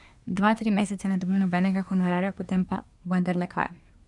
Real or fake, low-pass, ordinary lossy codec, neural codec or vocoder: fake; 10.8 kHz; none; codec, 24 kHz, 1 kbps, SNAC